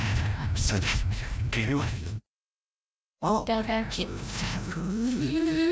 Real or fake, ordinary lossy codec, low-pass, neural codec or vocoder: fake; none; none; codec, 16 kHz, 0.5 kbps, FreqCodec, larger model